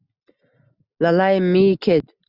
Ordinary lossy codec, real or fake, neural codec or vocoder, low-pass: Opus, 64 kbps; real; none; 5.4 kHz